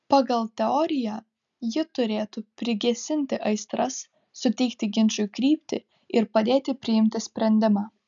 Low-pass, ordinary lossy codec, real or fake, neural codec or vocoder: 7.2 kHz; MP3, 96 kbps; real; none